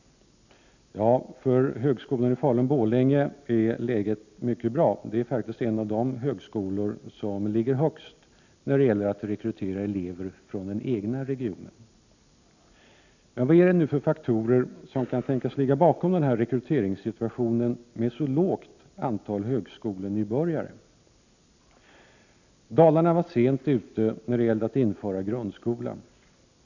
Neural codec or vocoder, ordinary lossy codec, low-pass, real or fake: none; none; 7.2 kHz; real